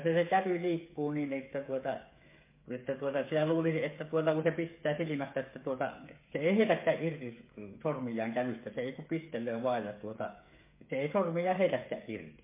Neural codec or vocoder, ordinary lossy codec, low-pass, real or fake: codec, 16 kHz, 8 kbps, FreqCodec, smaller model; MP3, 24 kbps; 3.6 kHz; fake